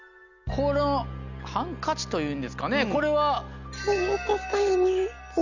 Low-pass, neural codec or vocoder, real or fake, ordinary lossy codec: 7.2 kHz; none; real; none